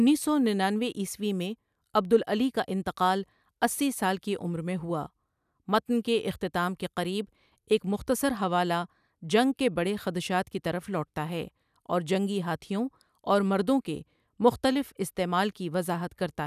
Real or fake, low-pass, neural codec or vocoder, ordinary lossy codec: real; 14.4 kHz; none; none